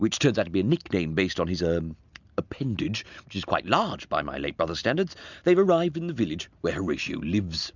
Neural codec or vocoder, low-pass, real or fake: none; 7.2 kHz; real